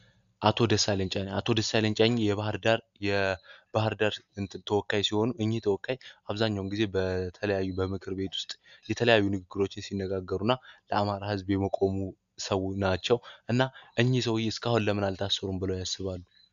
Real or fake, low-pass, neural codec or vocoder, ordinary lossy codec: real; 7.2 kHz; none; AAC, 96 kbps